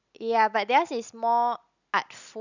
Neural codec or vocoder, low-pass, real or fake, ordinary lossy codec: none; 7.2 kHz; real; none